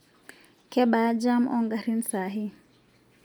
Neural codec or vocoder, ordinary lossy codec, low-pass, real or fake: none; none; none; real